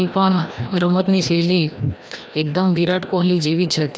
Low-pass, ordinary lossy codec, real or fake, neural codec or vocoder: none; none; fake; codec, 16 kHz, 1 kbps, FreqCodec, larger model